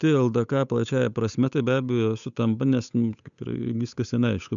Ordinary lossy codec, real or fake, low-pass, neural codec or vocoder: MP3, 96 kbps; fake; 7.2 kHz; codec, 16 kHz, 8 kbps, FunCodec, trained on Chinese and English, 25 frames a second